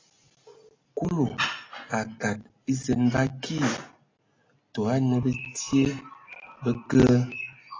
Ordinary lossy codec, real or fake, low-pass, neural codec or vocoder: AAC, 32 kbps; real; 7.2 kHz; none